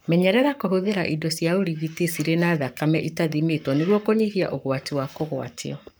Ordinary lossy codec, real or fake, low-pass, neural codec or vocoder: none; fake; none; codec, 44.1 kHz, 7.8 kbps, Pupu-Codec